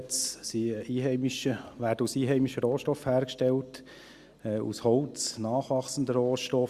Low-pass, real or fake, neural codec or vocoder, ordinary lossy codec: 14.4 kHz; real; none; Opus, 64 kbps